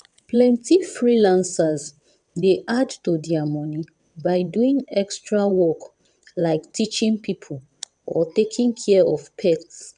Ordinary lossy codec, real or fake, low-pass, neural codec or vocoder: none; fake; 9.9 kHz; vocoder, 22.05 kHz, 80 mel bands, WaveNeXt